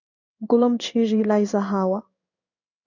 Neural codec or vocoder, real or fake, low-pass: codec, 16 kHz in and 24 kHz out, 1 kbps, XY-Tokenizer; fake; 7.2 kHz